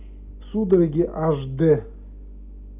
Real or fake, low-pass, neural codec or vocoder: real; 3.6 kHz; none